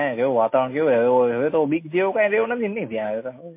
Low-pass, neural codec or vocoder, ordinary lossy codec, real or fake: 3.6 kHz; none; MP3, 24 kbps; real